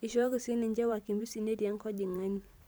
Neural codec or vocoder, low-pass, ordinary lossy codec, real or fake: vocoder, 44.1 kHz, 128 mel bands every 512 samples, BigVGAN v2; none; none; fake